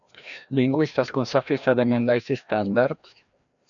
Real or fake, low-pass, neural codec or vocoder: fake; 7.2 kHz; codec, 16 kHz, 1 kbps, FreqCodec, larger model